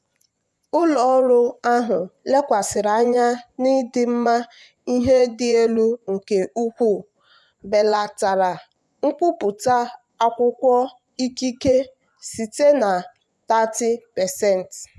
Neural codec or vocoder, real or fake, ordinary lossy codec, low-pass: vocoder, 24 kHz, 100 mel bands, Vocos; fake; none; none